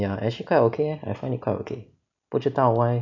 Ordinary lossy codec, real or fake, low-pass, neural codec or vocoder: none; real; 7.2 kHz; none